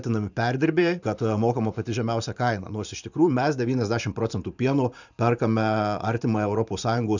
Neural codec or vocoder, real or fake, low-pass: vocoder, 44.1 kHz, 128 mel bands every 512 samples, BigVGAN v2; fake; 7.2 kHz